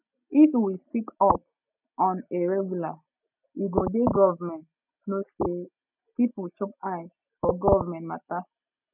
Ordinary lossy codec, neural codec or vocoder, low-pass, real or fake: none; none; 3.6 kHz; real